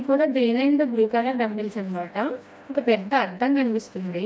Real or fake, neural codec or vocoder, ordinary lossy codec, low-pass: fake; codec, 16 kHz, 1 kbps, FreqCodec, smaller model; none; none